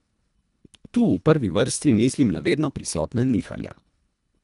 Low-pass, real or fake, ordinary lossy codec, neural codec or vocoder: 10.8 kHz; fake; none; codec, 24 kHz, 1.5 kbps, HILCodec